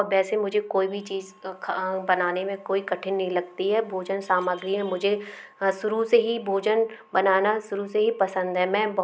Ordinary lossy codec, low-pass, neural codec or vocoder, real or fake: none; none; none; real